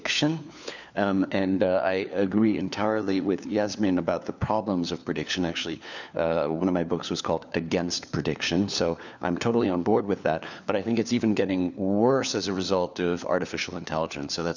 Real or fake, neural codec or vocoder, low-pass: fake; codec, 16 kHz, 4 kbps, FunCodec, trained on LibriTTS, 50 frames a second; 7.2 kHz